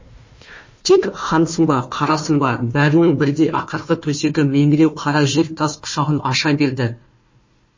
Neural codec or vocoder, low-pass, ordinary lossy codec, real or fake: codec, 16 kHz, 1 kbps, FunCodec, trained on Chinese and English, 50 frames a second; 7.2 kHz; MP3, 32 kbps; fake